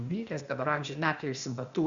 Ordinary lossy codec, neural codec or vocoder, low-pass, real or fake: Opus, 64 kbps; codec, 16 kHz, 0.8 kbps, ZipCodec; 7.2 kHz; fake